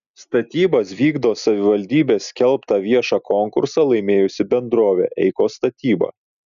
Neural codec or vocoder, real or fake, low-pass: none; real; 7.2 kHz